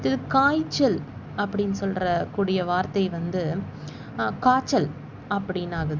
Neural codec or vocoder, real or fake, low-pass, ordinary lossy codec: none; real; 7.2 kHz; Opus, 64 kbps